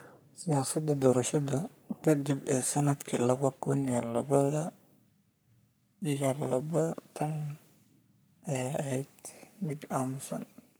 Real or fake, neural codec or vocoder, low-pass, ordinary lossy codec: fake; codec, 44.1 kHz, 3.4 kbps, Pupu-Codec; none; none